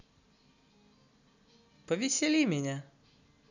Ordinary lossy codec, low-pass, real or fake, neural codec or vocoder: none; 7.2 kHz; real; none